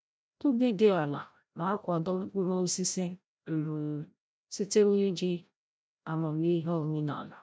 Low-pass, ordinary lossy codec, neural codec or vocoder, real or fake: none; none; codec, 16 kHz, 0.5 kbps, FreqCodec, larger model; fake